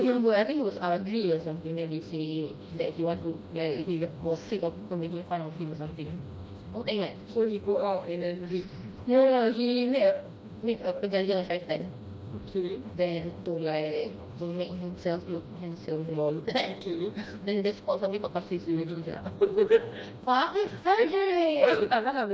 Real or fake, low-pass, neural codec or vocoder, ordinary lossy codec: fake; none; codec, 16 kHz, 1 kbps, FreqCodec, smaller model; none